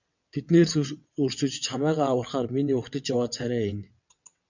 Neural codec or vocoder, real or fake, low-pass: vocoder, 22.05 kHz, 80 mel bands, WaveNeXt; fake; 7.2 kHz